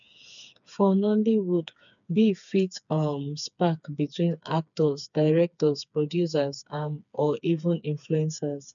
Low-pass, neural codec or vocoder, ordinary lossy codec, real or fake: 7.2 kHz; codec, 16 kHz, 4 kbps, FreqCodec, smaller model; none; fake